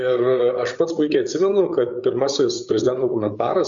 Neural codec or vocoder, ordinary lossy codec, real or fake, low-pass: codec, 16 kHz, 8 kbps, FreqCodec, larger model; Opus, 64 kbps; fake; 7.2 kHz